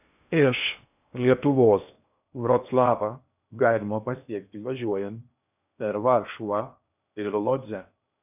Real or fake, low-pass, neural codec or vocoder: fake; 3.6 kHz; codec, 16 kHz in and 24 kHz out, 0.6 kbps, FocalCodec, streaming, 2048 codes